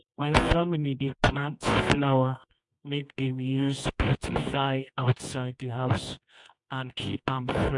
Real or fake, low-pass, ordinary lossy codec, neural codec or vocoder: fake; 10.8 kHz; MP3, 64 kbps; codec, 24 kHz, 0.9 kbps, WavTokenizer, medium music audio release